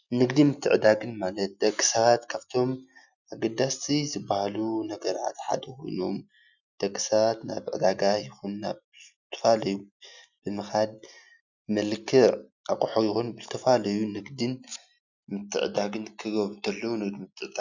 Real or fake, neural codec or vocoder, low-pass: real; none; 7.2 kHz